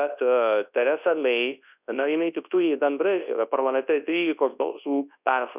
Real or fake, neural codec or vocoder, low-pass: fake; codec, 24 kHz, 0.9 kbps, WavTokenizer, large speech release; 3.6 kHz